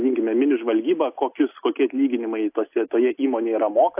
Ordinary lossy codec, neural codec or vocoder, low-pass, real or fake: AAC, 32 kbps; none; 3.6 kHz; real